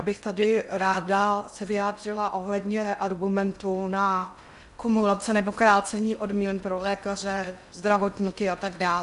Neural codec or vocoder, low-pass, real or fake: codec, 16 kHz in and 24 kHz out, 0.6 kbps, FocalCodec, streaming, 2048 codes; 10.8 kHz; fake